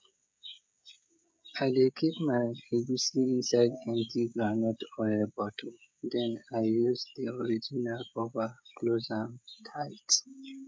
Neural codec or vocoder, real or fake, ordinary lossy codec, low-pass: codec, 16 kHz, 16 kbps, FreqCodec, smaller model; fake; none; none